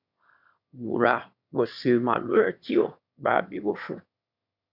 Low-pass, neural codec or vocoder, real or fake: 5.4 kHz; autoencoder, 22.05 kHz, a latent of 192 numbers a frame, VITS, trained on one speaker; fake